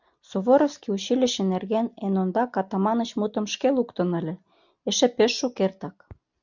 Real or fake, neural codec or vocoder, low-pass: real; none; 7.2 kHz